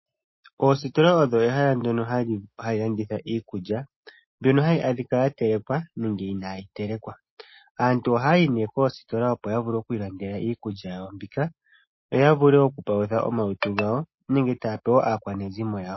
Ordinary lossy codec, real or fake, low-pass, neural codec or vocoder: MP3, 24 kbps; real; 7.2 kHz; none